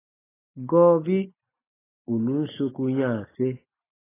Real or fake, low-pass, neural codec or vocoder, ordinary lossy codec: real; 3.6 kHz; none; AAC, 16 kbps